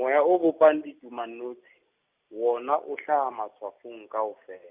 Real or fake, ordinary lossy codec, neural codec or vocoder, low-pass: real; Opus, 64 kbps; none; 3.6 kHz